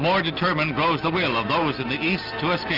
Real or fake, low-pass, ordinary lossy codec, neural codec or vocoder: real; 5.4 kHz; Opus, 64 kbps; none